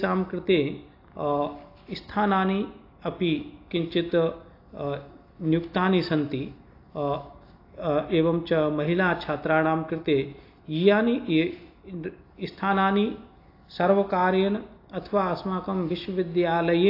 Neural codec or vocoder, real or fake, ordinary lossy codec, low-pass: none; real; AAC, 32 kbps; 5.4 kHz